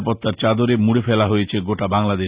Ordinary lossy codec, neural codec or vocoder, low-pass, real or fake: Opus, 64 kbps; none; 3.6 kHz; real